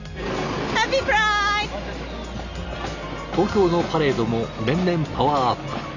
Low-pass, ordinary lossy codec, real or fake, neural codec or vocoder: 7.2 kHz; none; real; none